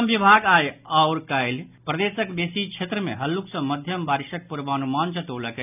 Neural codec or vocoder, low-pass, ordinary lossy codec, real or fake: none; 3.6 kHz; none; real